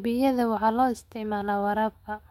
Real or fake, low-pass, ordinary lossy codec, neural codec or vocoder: fake; 19.8 kHz; MP3, 64 kbps; autoencoder, 48 kHz, 128 numbers a frame, DAC-VAE, trained on Japanese speech